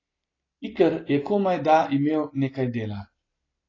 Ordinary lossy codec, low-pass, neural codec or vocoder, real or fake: AAC, 32 kbps; 7.2 kHz; none; real